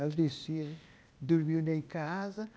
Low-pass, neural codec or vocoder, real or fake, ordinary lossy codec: none; codec, 16 kHz, 0.8 kbps, ZipCodec; fake; none